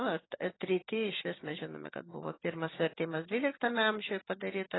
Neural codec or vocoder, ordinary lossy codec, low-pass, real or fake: none; AAC, 16 kbps; 7.2 kHz; real